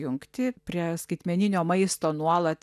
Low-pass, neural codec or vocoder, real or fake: 14.4 kHz; none; real